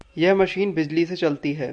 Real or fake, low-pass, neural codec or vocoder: real; 9.9 kHz; none